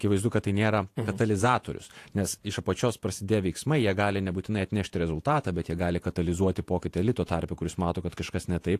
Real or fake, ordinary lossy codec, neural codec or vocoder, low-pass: fake; AAC, 64 kbps; vocoder, 48 kHz, 128 mel bands, Vocos; 14.4 kHz